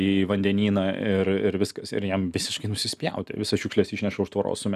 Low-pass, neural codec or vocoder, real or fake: 14.4 kHz; none; real